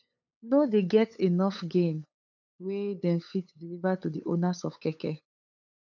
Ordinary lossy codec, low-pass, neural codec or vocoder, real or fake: none; 7.2 kHz; codec, 16 kHz, 8 kbps, FunCodec, trained on LibriTTS, 25 frames a second; fake